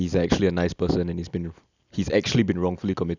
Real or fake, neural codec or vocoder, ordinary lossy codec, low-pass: real; none; none; 7.2 kHz